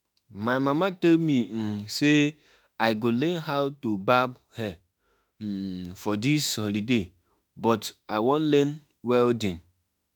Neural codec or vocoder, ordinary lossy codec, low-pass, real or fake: autoencoder, 48 kHz, 32 numbers a frame, DAC-VAE, trained on Japanese speech; none; none; fake